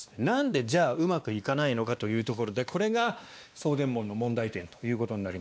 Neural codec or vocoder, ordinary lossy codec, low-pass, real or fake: codec, 16 kHz, 2 kbps, X-Codec, WavLM features, trained on Multilingual LibriSpeech; none; none; fake